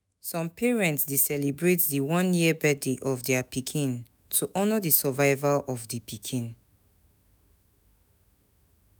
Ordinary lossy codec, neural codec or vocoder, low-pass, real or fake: none; autoencoder, 48 kHz, 128 numbers a frame, DAC-VAE, trained on Japanese speech; none; fake